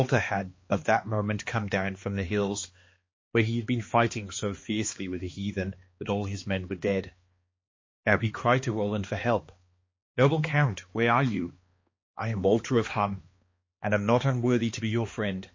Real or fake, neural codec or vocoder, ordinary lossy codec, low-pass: fake; codec, 16 kHz, 2 kbps, X-Codec, HuBERT features, trained on balanced general audio; MP3, 32 kbps; 7.2 kHz